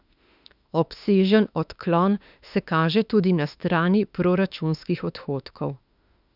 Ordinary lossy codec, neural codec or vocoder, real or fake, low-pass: none; autoencoder, 48 kHz, 32 numbers a frame, DAC-VAE, trained on Japanese speech; fake; 5.4 kHz